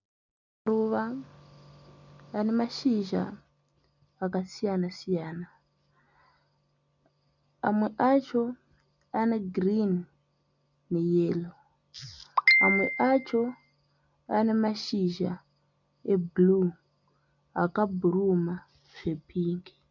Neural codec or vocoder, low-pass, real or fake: none; 7.2 kHz; real